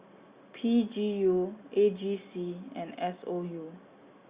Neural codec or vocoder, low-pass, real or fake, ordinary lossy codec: none; 3.6 kHz; real; Opus, 64 kbps